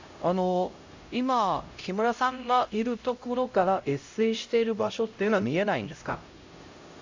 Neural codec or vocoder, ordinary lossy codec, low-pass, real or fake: codec, 16 kHz, 0.5 kbps, X-Codec, HuBERT features, trained on LibriSpeech; AAC, 48 kbps; 7.2 kHz; fake